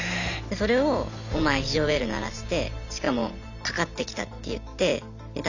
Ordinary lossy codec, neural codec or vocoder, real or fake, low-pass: none; none; real; 7.2 kHz